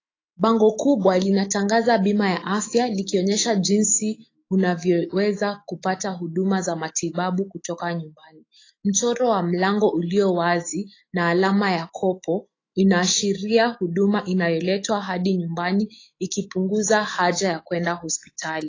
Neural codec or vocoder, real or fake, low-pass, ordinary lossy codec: autoencoder, 48 kHz, 128 numbers a frame, DAC-VAE, trained on Japanese speech; fake; 7.2 kHz; AAC, 32 kbps